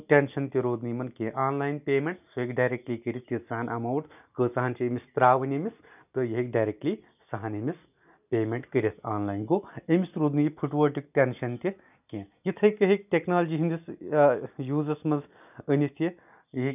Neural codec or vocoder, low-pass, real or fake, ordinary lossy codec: none; 3.6 kHz; real; none